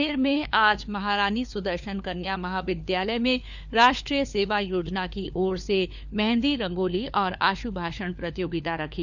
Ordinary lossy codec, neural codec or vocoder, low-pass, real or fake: none; codec, 16 kHz, 4 kbps, FunCodec, trained on LibriTTS, 50 frames a second; 7.2 kHz; fake